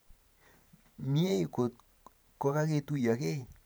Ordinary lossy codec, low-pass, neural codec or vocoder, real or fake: none; none; vocoder, 44.1 kHz, 128 mel bands every 512 samples, BigVGAN v2; fake